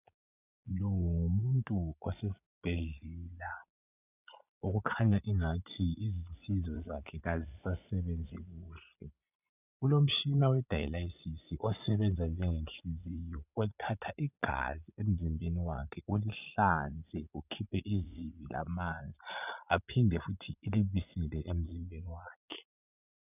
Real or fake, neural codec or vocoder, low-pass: fake; vocoder, 22.05 kHz, 80 mel bands, Vocos; 3.6 kHz